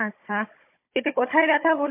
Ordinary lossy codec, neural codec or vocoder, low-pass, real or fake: MP3, 24 kbps; codec, 16 kHz, 16 kbps, FunCodec, trained on Chinese and English, 50 frames a second; 3.6 kHz; fake